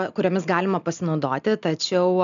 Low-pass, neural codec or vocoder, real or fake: 7.2 kHz; none; real